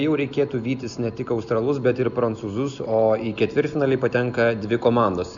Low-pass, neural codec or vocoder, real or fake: 7.2 kHz; none; real